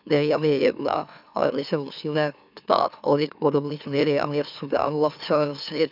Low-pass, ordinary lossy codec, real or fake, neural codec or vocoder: 5.4 kHz; none; fake; autoencoder, 44.1 kHz, a latent of 192 numbers a frame, MeloTTS